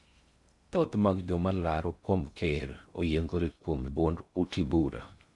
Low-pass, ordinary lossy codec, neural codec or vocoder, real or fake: 10.8 kHz; Opus, 64 kbps; codec, 16 kHz in and 24 kHz out, 0.6 kbps, FocalCodec, streaming, 2048 codes; fake